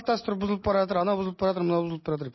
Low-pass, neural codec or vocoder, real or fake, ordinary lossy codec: 7.2 kHz; none; real; MP3, 24 kbps